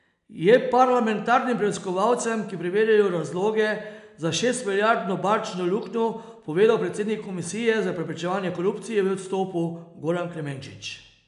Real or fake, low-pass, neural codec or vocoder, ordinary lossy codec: real; 10.8 kHz; none; none